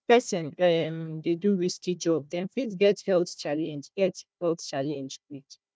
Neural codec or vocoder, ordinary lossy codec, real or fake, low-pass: codec, 16 kHz, 1 kbps, FunCodec, trained on Chinese and English, 50 frames a second; none; fake; none